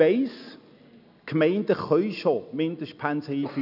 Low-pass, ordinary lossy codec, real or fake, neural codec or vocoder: 5.4 kHz; none; real; none